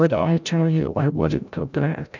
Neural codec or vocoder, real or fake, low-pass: codec, 16 kHz, 0.5 kbps, FreqCodec, larger model; fake; 7.2 kHz